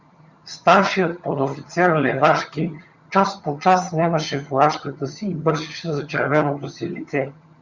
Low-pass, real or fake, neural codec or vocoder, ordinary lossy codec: 7.2 kHz; fake; vocoder, 22.05 kHz, 80 mel bands, HiFi-GAN; Opus, 64 kbps